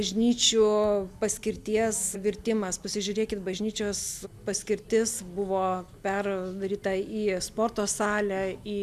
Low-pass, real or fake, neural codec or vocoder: 14.4 kHz; real; none